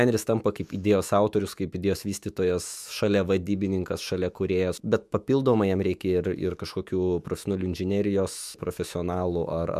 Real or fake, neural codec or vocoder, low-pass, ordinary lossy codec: fake; autoencoder, 48 kHz, 128 numbers a frame, DAC-VAE, trained on Japanese speech; 14.4 kHz; MP3, 96 kbps